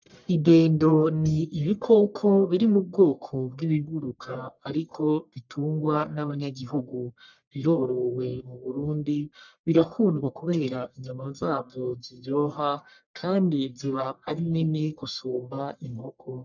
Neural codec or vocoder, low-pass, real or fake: codec, 44.1 kHz, 1.7 kbps, Pupu-Codec; 7.2 kHz; fake